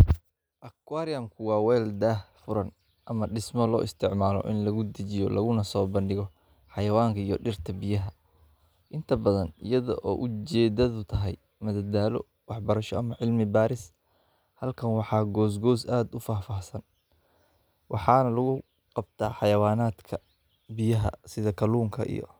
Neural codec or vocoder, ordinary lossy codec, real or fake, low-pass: none; none; real; none